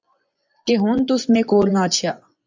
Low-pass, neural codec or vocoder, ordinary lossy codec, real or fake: 7.2 kHz; vocoder, 24 kHz, 100 mel bands, Vocos; MP3, 64 kbps; fake